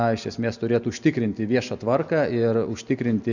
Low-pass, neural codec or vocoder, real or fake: 7.2 kHz; none; real